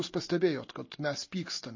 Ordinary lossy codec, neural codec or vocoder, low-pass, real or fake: MP3, 32 kbps; none; 7.2 kHz; real